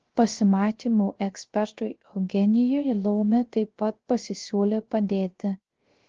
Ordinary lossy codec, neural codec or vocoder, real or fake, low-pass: Opus, 16 kbps; codec, 16 kHz, 0.3 kbps, FocalCodec; fake; 7.2 kHz